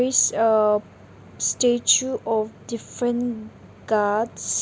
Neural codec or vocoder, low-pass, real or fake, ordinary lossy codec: none; none; real; none